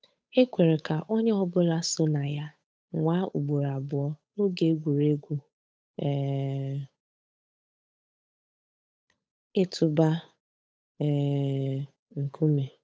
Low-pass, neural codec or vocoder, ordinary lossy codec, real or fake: none; codec, 16 kHz, 8 kbps, FunCodec, trained on Chinese and English, 25 frames a second; none; fake